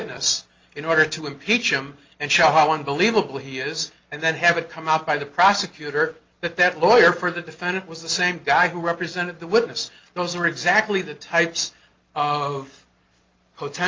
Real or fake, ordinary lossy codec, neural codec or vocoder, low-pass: real; Opus, 24 kbps; none; 7.2 kHz